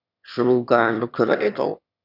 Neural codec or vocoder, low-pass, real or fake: autoencoder, 22.05 kHz, a latent of 192 numbers a frame, VITS, trained on one speaker; 5.4 kHz; fake